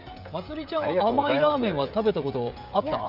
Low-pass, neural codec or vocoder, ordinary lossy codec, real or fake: 5.4 kHz; codec, 16 kHz, 16 kbps, FreqCodec, smaller model; none; fake